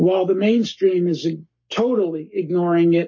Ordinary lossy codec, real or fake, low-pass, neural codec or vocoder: MP3, 32 kbps; fake; 7.2 kHz; codec, 44.1 kHz, 7.8 kbps, Pupu-Codec